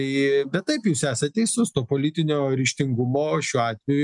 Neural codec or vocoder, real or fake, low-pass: none; real; 10.8 kHz